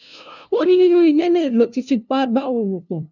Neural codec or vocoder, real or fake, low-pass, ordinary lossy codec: codec, 16 kHz, 0.5 kbps, FunCodec, trained on LibriTTS, 25 frames a second; fake; 7.2 kHz; none